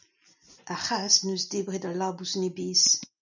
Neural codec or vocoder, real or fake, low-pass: none; real; 7.2 kHz